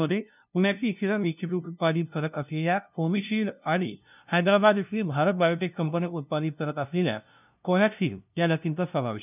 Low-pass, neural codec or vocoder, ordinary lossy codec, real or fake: 3.6 kHz; codec, 16 kHz, 0.5 kbps, FunCodec, trained on LibriTTS, 25 frames a second; none; fake